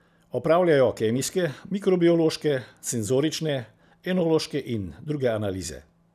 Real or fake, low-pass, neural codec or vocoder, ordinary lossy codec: real; 14.4 kHz; none; none